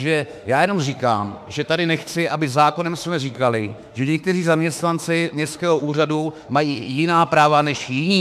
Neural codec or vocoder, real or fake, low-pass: autoencoder, 48 kHz, 32 numbers a frame, DAC-VAE, trained on Japanese speech; fake; 14.4 kHz